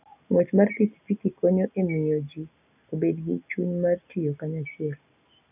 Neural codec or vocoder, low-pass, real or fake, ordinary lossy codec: none; 3.6 kHz; real; none